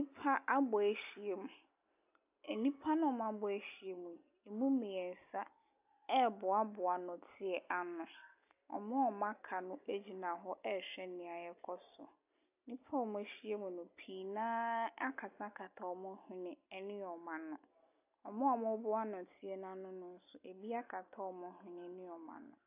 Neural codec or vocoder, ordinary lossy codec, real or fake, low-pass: none; AAC, 32 kbps; real; 3.6 kHz